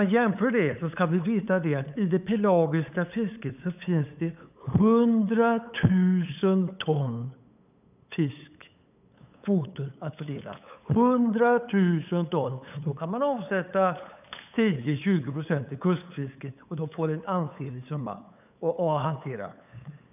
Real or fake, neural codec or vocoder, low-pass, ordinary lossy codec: fake; codec, 16 kHz, 8 kbps, FunCodec, trained on LibriTTS, 25 frames a second; 3.6 kHz; none